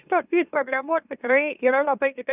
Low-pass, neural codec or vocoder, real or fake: 3.6 kHz; autoencoder, 44.1 kHz, a latent of 192 numbers a frame, MeloTTS; fake